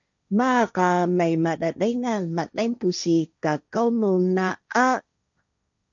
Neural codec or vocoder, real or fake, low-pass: codec, 16 kHz, 1.1 kbps, Voila-Tokenizer; fake; 7.2 kHz